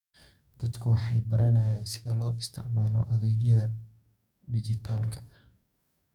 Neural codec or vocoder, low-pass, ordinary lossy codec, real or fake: codec, 44.1 kHz, 2.6 kbps, DAC; 19.8 kHz; none; fake